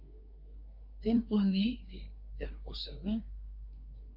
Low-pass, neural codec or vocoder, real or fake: 5.4 kHz; codec, 24 kHz, 1 kbps, SNAC; fake